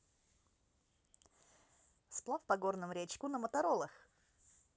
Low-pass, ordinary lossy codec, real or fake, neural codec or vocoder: none; none; real; none